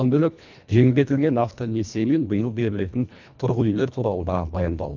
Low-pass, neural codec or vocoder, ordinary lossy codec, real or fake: 7.2 kHz; codec, 24 kHz, 1.5 kbps, HILCodec; none; fake